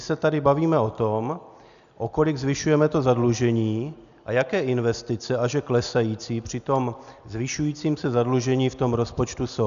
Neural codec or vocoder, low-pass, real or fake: none; 7.2 kHz; real